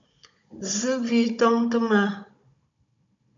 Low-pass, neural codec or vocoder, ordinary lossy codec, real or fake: 7.2 kHz; codec, 16 kHz, 16 kbps, FunCodec, trained on Chinese and English, 50 frames a second; AAC, 48 kbps; fake